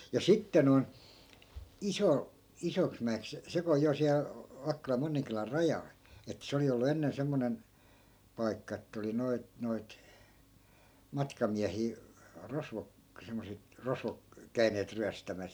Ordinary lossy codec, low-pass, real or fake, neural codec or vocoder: none; none; real; none